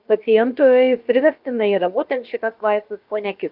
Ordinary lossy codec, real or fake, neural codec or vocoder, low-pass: Opus, 24 kbps; fake; codec, 16 kHz, about 1 kbps, DyCAST, with the encoder's durations; 5.4 kHz